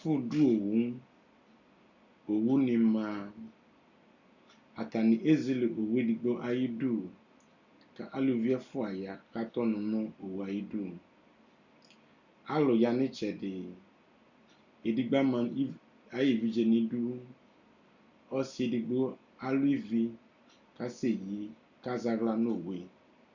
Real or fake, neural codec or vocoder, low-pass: real; none; 7.2 kHz